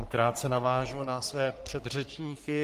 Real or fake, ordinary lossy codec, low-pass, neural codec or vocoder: fake; Opus, 24 kbps; 14.4 kHz; codec, 44.1 kHz, 3.4 kbps, Pupu-Codec